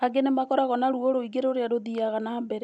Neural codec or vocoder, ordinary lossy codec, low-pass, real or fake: none; none; none; real